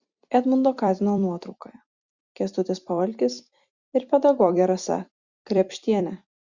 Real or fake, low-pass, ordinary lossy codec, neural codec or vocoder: fake; 7.2 kHz; Opus, 64 kbps; vocoder, 44.1 kHz, 128 mel bands every 256 samples, BigVGAN v2